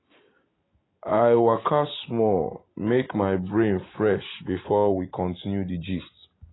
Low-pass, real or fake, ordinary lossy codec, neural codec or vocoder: 7.2 kHz; real; AAC, 16 kbps; none